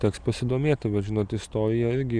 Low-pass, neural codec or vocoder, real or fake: 9.9 kHz; none; real